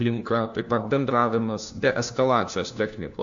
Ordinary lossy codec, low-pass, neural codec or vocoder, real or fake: AAC, 64 kbps; 7.2 kHz; codec, 16 kHz, 1 kbps, FunCodec, trained on LibriTTS, 50 frames a second; fake